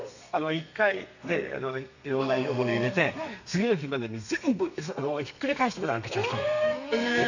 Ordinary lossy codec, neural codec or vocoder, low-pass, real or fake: none; codec, 32 kHz, 1.9 kbps, SNAC; 7.2 kHz; fake